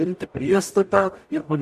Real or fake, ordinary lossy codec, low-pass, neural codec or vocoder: fake; MP3, 64 kbps; 14.4 kHz; codec, 44.1 kHz, 0.9 kbps, DAC